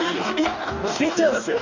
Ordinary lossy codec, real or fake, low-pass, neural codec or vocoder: Opus, 64 kbps; fake; 7.2 kHz; codec, 44.1 kHz, 2.6 kbps, DAC